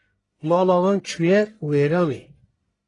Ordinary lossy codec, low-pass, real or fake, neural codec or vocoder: AAC, 32 kbps; 10.8 kHz; fake; codec, 44.1 kHz, 1.7 kbps, Pupu-Codec